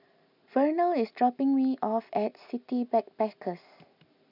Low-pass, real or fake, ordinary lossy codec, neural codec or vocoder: 5.4 kHz; real; none; none